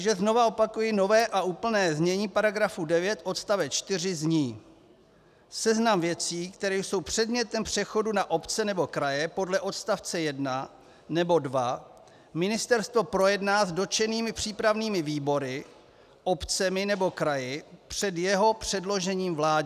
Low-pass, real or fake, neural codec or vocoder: 14.4 kHz; real; none